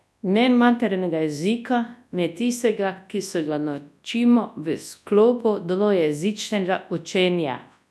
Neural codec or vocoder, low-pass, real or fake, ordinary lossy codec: codec, 24 kHz, 0.9 kbps, WavTokenizer, large speech release; none; fake; none